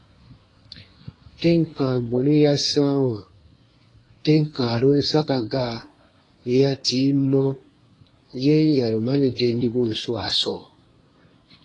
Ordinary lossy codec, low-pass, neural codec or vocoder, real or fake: AAC, 32 kbps; 10.8 kHz; codec, 24 kHz, 1 kbps, SNAC; fake